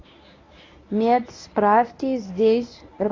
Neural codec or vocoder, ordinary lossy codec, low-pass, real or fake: codec, 24 kHz, 0.9 kbps, WavTokenizer, medium speech release version 1; AAC, 32 kbps; 7.2 kHz; fake